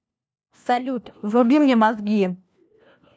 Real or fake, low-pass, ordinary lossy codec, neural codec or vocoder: fake; none; none; codec, 16 kHz, 1 kbps, FunCodec, trained on LibriTTS, 50 frames a second